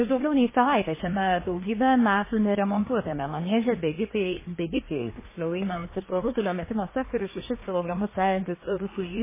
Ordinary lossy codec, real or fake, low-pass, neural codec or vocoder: MP3, 16 kbps; fake; 3.6 kHz; codec, 24 kHz, 1 kbps, SNAC